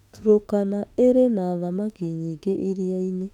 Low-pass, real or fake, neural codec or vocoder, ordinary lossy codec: 19.8 kHz; fake; autoencoder, 48 kHz, 32 numbers a frame, DAC-VAE, trained on Japanese speech; none